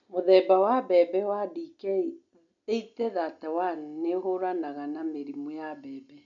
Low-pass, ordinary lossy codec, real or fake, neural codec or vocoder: 7.2 kHz; none; real; none